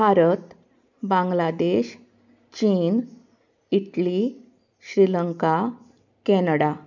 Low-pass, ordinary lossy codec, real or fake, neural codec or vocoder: 7.2 kHz; none; real; none